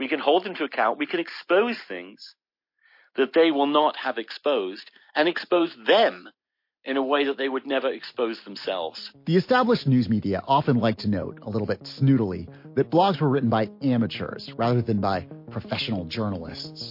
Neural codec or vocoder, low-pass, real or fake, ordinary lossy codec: none; 5.4 kHz; real; MP3, 32 kbps